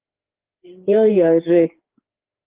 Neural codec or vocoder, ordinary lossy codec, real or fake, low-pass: codec, 16 kHz, 4 kbps, FreqCodec, larger model; Opus, 16 kbps; fake; 3.6 kHz